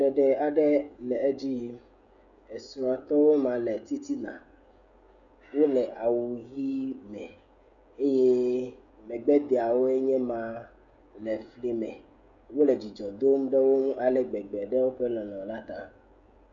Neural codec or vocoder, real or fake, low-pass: codec, 16 kHz, 16 kbps, FreqCodec, smaller model; fake; 7.2 kHz